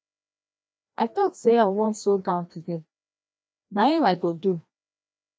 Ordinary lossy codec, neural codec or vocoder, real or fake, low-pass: none; codec, 16 kHz, 1 kbps, FreqCodec, larger model; fake; none